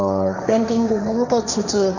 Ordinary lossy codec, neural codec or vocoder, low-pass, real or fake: none; codec, 16 kHz, 1.1 kbps, Voila-Tokenizer; 7.2 kHz; fake